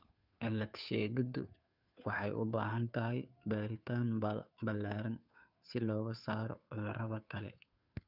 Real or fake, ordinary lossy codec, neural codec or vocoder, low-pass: fake; none; codec, 16 kHz, 2 kbps, FunCodec, trained on Chinese and English, 25 frames a second; 5.4 kHz